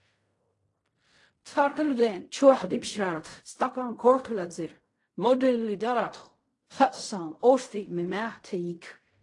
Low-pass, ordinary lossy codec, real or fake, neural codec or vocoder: 10.8 kHz; AAC, 48 kbps; fake; codec, 16 kHz in and 24 kHz out, 0.4 kbps, LongCat-Audio-Codec, fine tuned four codebook decoder